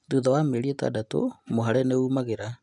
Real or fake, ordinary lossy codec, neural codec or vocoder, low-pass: real; none; none; 10.8 kHz